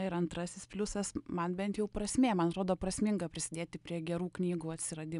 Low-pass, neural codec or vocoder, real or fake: 10.8 kHz; none; real